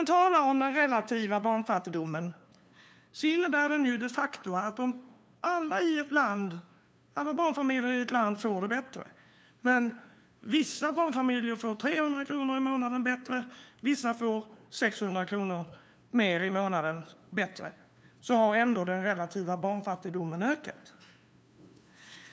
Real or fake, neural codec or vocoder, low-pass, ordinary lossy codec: fake; codec, 16 kHz, 2 kbps, FunCodec, trained on LibriTTS, 25 frames a second; none; none